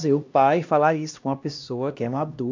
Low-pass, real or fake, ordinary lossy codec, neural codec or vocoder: 7.2 kHz; fake; MP3, 64 kbps; codec, 16 kHz, 1 kbps, X-Codec, HuBERT features, trained on LibriSpeech